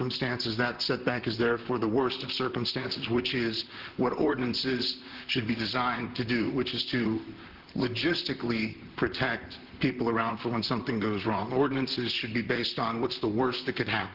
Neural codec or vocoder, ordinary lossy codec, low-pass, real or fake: vocoder, 44.1 kHz, 128 mel bands, Pupu-Vocoder; Opus, 16 kbps; 5.4 kHz; fake